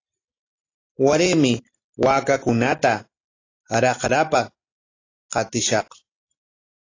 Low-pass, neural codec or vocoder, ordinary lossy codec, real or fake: 7.2 kHz; none; AAC, 32 kbps; real